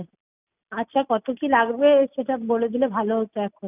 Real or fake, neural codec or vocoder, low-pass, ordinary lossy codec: real; none; 3.6 kHz; none